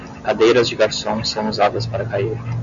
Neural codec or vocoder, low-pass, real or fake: none; 7.2 kHz; real